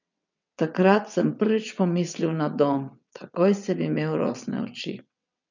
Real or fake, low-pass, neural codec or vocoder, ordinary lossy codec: fake; 7.2 kHz; vocoder, 22.05 kHz, 80 mel bands, WaveNeXt; none